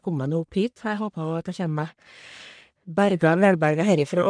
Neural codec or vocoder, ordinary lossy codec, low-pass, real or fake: codec, 44.1 kHz, 1.7 kbps, Pupu-Codec; none; 9.9 kHz; fake